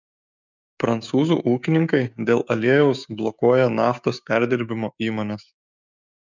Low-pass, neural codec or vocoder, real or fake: 7.2 kHz; codec, 16 kHz, 6 kbps, DAC; fake